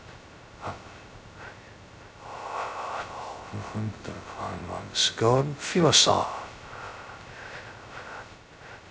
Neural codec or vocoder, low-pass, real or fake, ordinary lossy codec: codec, 16 kHz, 0.2 kbps, FocalCodec; none; fake; none